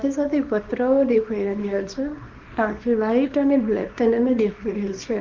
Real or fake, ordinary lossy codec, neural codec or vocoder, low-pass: fake; Opus, 24 kbps; codec, 24 kHz, 0.9 kbps, WavTokenizer, small release; 7.2 kHz